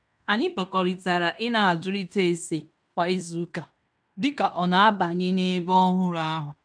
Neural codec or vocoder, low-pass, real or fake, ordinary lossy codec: codec, 16 kHz in and 24 kHz out, 0.9 kbps, LongCat-Audio-Codec, fine tuned four codebook decoder; 9.9 kHz; fake; none